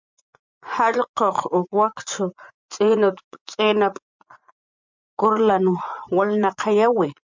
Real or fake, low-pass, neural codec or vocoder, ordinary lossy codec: fake; 7.2 kHz; vocoder, 24 kHz, 100 mel bands, Vocos; MP3, 64 kbps